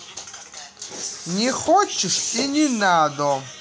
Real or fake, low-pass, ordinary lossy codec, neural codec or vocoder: real; none; none; none